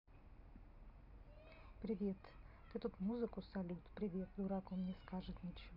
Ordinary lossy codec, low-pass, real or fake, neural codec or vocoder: none; 5.4 kHz; real; none